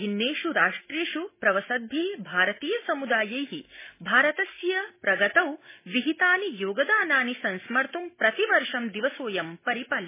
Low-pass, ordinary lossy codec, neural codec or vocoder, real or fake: 3.6 kHz; MP3, 16 kbps; none; real